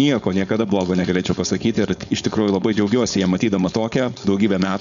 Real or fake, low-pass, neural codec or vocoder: fake; 7.2 kHz; codec, 16 kHz, 4.8 kbps, FACodec